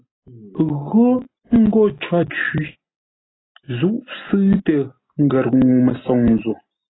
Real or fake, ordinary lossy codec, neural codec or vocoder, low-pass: real; AAC, 16 kbps; none; 7.2 kHz